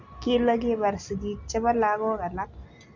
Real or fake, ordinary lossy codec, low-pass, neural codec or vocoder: real; none; 7.2 kHz; none